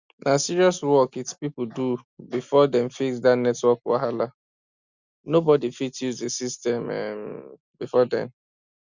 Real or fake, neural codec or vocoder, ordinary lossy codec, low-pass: real; none; Opus, 64 kbps; 7.2 kHz